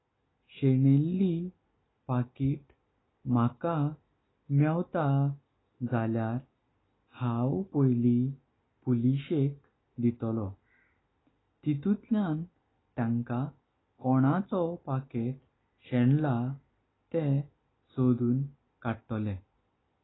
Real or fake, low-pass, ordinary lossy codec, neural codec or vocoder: real; 7.2 kHz; AAC, 16 kbps; none